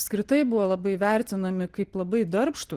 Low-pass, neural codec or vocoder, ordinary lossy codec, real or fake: 14.4 kHz; none; Opus, 16 kbps; real